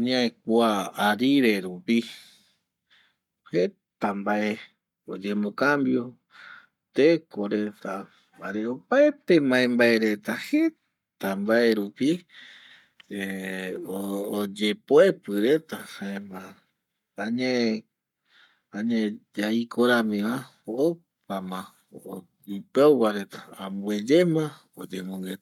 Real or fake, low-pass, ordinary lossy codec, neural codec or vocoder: fake; 19.8 kHz; none; codec, 44.1 kHz, 7.8 kbps, Pupu-Codec